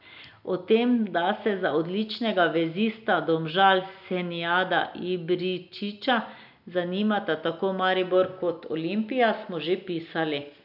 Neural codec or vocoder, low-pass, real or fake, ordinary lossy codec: none; 5.4 kHz; real; none